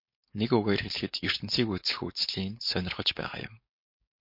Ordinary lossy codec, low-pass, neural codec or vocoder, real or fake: MP3, 32 kbps; 5.4 kHz; codec, 16 kHz, 4.8 kbps, FACodec; fake